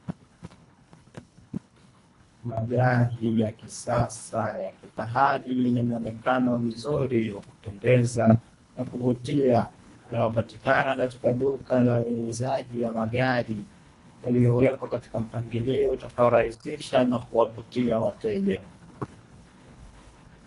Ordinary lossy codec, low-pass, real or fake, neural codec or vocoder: AAC, 48 kbps; 10.8 kHz; fake; codec, 24 kHz, 1.5 kbps, HILCodec